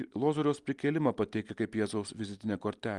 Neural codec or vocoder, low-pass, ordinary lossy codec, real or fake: none; 10.8 kHz; Opus, 32 kbps; real